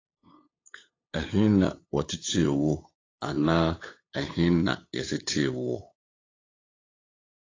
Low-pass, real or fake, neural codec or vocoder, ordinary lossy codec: 7.2 kHz; fake; codec, 16 kHz, 8 kbps, FunCodec, trained on LibriTTS, 25 frames a second; AAC, 32 kbps